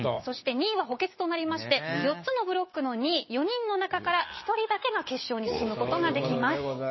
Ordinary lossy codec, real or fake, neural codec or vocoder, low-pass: MP3, 24 kbps; fake; codec, 16 kHz, 6 kbps, DAC; 7.2 kHz